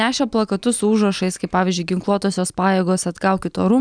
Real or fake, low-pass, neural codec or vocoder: real; 9.9 kHz; none